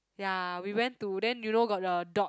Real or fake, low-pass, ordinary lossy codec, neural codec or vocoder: real; none; none; none